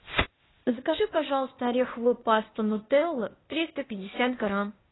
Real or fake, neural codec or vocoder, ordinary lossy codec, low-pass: fake; codec, 16 kHz, 0.8 kbps, ZipCodec; AAC, 16 kbps; 7.2 kHz